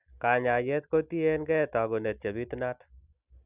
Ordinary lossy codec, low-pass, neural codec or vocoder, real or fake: none; 3.6 kHz; none; real